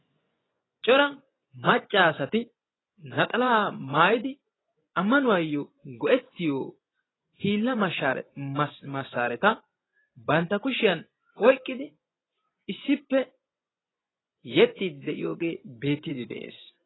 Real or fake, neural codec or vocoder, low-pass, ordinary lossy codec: fake; vocoder, 24 kHz, 100 mel bands, Vocos; 7.2 kHz; AAC, 16 kbps